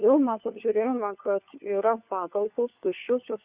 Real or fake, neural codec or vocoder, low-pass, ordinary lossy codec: fake; codec, 16 kHz, 4 kbps, FunCodec, trained on LibriTTS, 50 frames a second; 3.6 kHz; Opus, 64 kbps